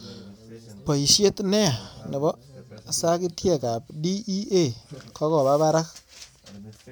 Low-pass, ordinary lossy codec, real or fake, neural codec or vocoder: none; none; real; none